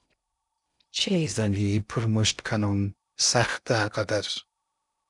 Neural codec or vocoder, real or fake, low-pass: codec, 16 kHz in and 24 kHz out, 0.6 kbps, FocalCodec, streaming, 2048 codes; fake; 10.8 kHz